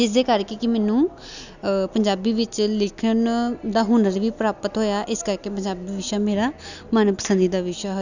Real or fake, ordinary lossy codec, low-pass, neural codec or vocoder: real; none; 7.2 kHz; none